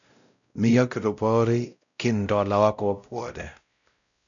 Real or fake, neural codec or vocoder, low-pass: fake; codec, 16 kHz, 0.5 kbps, X-Codec, WavLM features, trained on Multilingual LibriSpeech; 7.2 kHz